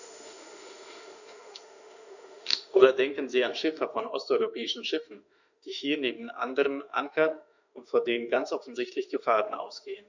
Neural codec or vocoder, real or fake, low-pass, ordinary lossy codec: autoencoder, 48 kHz, 32 numbers a frame, DAC-VAE, trained on Japanese speech; fake; 7.2 kHz; none